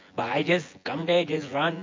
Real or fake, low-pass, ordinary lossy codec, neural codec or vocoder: fake; 7.2 kHz; MP3, 48 kbps; vocoder, 24 kHz, 100 mel bands, Vocos